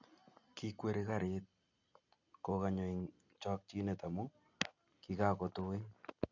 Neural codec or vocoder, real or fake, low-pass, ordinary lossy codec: none; real; 7.2 kHz; none